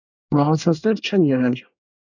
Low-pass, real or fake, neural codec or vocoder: 7.2 kHz; fake; codec, 24 kHz, 1 kbps, SNAC